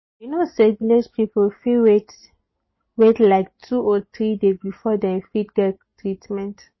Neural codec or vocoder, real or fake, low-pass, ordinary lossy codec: none; real; 7.2 kHz; MP3, 24 kbps